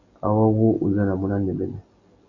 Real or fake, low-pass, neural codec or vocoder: real; 7.2 kHz; none